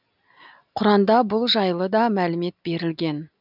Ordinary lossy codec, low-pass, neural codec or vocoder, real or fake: none; 5.4 kHz; none; real